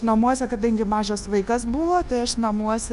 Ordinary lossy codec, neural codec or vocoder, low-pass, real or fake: Opus, 64 kbps; codec, 24 kHz, 1.2 kbps, DualCodec; 10.8 kHz; fake